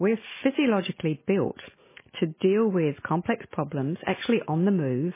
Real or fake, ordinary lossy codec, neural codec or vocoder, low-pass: real; MP3, 16 kbps; none; 3.6 kHz